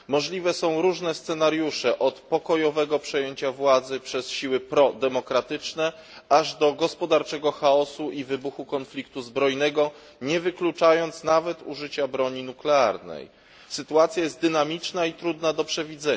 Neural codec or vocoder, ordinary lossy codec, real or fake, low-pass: none; none; real; none